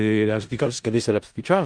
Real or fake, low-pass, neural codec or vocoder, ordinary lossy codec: fake; 9.9 kHz; codec, 16 kHz in and 24 kHz out, 0.4 kbps, LongCat-Audio-Codec, four codebook decoder; Opus, 32 kbps